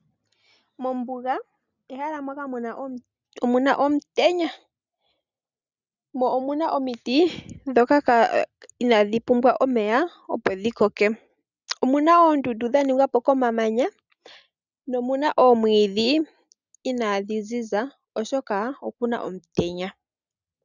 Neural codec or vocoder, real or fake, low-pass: none; real; 7.2 kHz